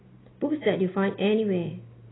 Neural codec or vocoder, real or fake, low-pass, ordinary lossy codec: none; real; 7.2 kHz; AAC, 16 kbps